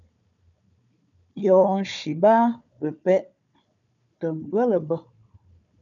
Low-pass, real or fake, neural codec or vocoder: 7.2 kHz; fake; codec, 16 kHz, 4 kbps, FunCodec, trained on Chinese and English, 50 frames a second